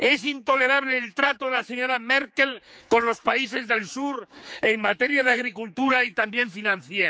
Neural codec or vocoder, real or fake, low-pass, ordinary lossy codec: codec, 16 kHz, 4 kbps, X-Codec, HuBERT features, trained on general audio; fake; none; none